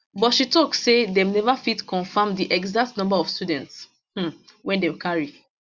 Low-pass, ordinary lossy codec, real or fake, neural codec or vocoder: 7.2 kHz; Opus, 64 kbps; real; none